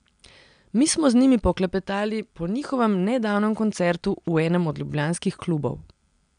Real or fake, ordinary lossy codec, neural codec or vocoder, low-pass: real; none; none; 9.9 kHz